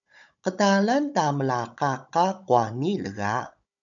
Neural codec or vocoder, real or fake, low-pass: codec, 16 kHz, 16 kbps, FunCodec, trained on Chinese and English, 50 frames a second; fake; 7.2 kHz